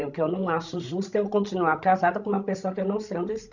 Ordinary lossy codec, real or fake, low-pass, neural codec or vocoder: none; fake; 7.2 kHz; codec, 16 kHz, 16 kbps, FreqCodec, larger model